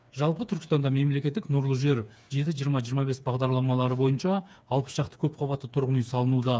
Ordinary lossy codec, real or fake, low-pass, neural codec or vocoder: none; fake; none; codec, 16 kHz, 4 kbps, FreqCodec, smaller model